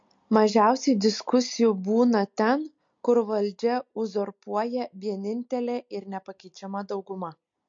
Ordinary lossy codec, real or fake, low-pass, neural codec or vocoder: MP3, 48 kbps; real; 7.2 kHz; none